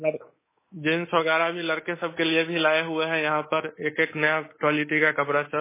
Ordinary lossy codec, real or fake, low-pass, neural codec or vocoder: MP3, 16 kbps; fake; 3.6 kHz; codec, 24 kHz, 3.1 kbps, DualCodec